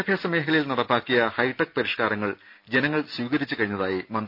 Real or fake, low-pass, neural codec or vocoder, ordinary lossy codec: real; 5.4 kHz; none; none